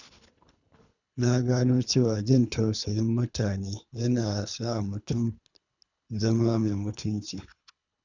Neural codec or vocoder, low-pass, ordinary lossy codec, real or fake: codec, 24 kHz, 3 kbps, HILCodec; 7.2 kHz; none; fake